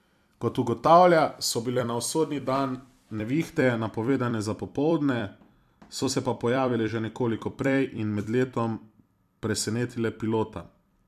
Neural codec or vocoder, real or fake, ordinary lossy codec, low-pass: vocoder, 44.1 kHz, 128 mel bands every 256 samples, BigVGAN v2; fake; MP3, 96 kbps; 14.4 kHz